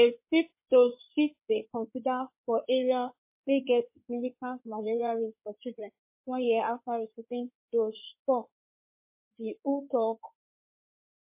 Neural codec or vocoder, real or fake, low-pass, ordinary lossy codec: codec, 16 kHz in and 24 kHz out, 2.2 kbps, FireRedTTS-2 codec; fake; 3.6 kHz; MP3, 24 kbps